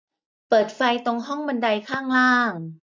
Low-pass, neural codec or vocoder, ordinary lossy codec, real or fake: none; none; none; real